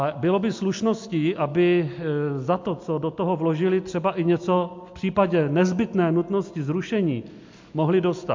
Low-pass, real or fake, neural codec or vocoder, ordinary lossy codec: 7.2 kHz; real; none; MP3, 48 kbps